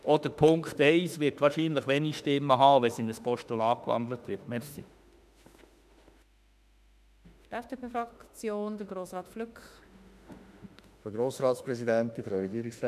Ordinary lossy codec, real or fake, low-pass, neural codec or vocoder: none; fake; 14.4 kHz; autoencoder, 48 kHz, 32 numbers a frame, DAC-VAE, trained on Japanese speech